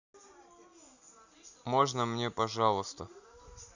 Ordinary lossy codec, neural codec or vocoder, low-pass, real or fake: none; none; 7.2 kHz; real